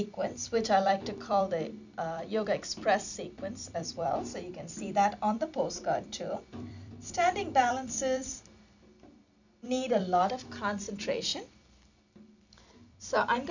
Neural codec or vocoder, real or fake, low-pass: vocoder, 44.1 kHz, 128 mel bands every 256 samples, BigVGAN v2; fake; 7.2 kHz